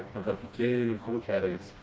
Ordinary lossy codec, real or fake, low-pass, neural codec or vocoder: none; fake; none; codec, 16 kHz, 1 kbps, FreqCodec, smaller model